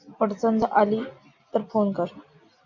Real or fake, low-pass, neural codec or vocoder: real; 7.2 kHz; none